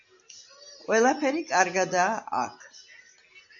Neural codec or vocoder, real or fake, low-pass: none; real; 7.2 kHz